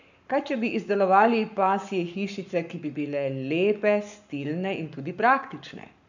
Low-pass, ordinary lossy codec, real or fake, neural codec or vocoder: 7.2 kHz; none; fake; codec, 44.1 kHz, 7.8 kbps, Pupu-Codec